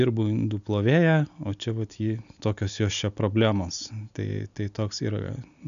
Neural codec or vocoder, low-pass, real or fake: none; 7.2 kHz; real